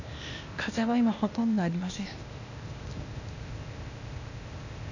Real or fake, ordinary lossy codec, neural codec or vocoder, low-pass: fake; none; codec, 16 kHz, 0.8 kbps, ZipCodec; 7.2 kHz